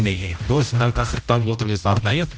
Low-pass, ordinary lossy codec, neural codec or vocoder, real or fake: none; none; codec, 16 kHz, 0.5 kbps, X-Codec, HuBERT features, trained on general audio; fake